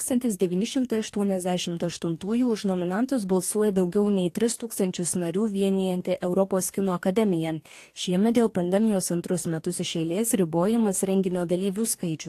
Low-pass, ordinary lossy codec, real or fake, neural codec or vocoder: 14.4 kHz; AAC, 64 kbps; fake; codec, 44.1 kHz, 2.6 kbps, DAC